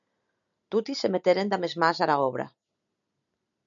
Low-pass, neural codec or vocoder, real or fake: 7.2 kHz; none; real